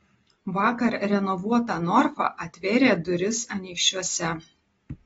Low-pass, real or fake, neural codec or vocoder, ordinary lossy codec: 19.8 kHz; real; none; AAC, 24 kbps